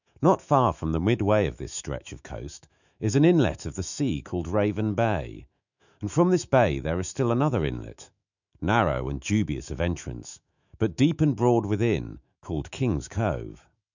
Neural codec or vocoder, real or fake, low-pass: autoencoder, 48 kHz, 128 numbers a frame, DAC-VAE, trained on Japanese speech; fake; 7.2 kHz